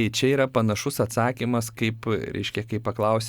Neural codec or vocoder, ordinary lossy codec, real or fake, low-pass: none; Opus, 64 kbps; real; 19.8 kHz